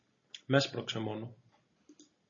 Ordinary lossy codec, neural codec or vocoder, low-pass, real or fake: MP3, 32 kbps; none; 7.2 kHz; real